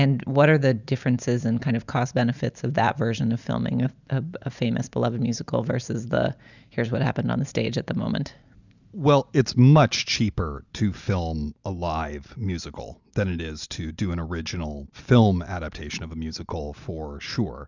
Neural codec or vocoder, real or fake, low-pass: none; real; 7.2 kHz